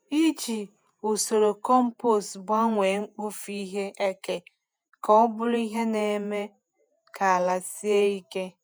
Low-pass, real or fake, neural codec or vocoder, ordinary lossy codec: none; fake; vocoder, 48 kHz, 128 mel bands, Vocos; none